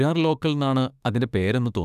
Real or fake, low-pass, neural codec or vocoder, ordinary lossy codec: fake; 14.4 kHz; autoencoder, 48 kHz, 32 numbers a frame, DAC-VAE, trained on Japanese speech; none